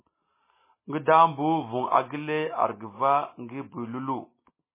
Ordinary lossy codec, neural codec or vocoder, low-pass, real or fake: MP3, 16 kbps; none; 3.6 kHz; real